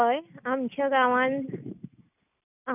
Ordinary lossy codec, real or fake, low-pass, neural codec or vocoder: none; real; 3.6 kHz; none